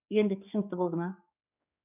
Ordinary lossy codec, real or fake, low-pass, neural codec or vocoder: none; fake; 3.6 kHz; codec, 16 kHz, 4 kbps, X-Codec, HuBERT features, trained on general audio